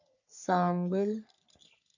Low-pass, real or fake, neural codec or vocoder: 7.2 kHz; fake; codec, 44.1 kHz, 3.4 kbps, Pupu-Codec